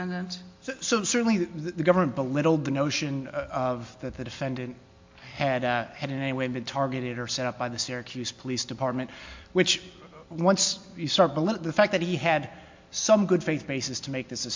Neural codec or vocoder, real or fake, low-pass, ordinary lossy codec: none; real; 7.2 kHz; MP3, 48 kbps